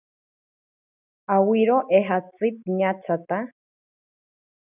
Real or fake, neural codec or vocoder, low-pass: real; none; 3.6 kHz